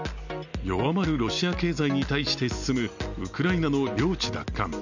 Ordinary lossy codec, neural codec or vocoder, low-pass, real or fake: none; none; 7.2 kHz; real